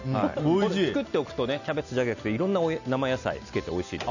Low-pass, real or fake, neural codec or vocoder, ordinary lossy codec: 7.2 kHz; real; none; none